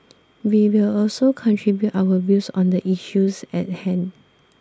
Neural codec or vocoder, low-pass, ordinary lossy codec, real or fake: none; none; none; real